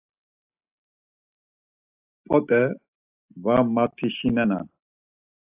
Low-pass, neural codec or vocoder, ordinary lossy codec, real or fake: 3.6 kHz; none; AAC, 32 kbps; real